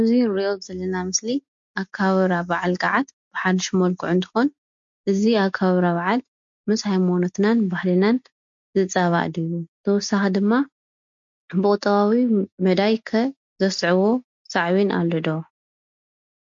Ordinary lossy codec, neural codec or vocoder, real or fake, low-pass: MP3, 48 kbps; none; real; 7.2 kHz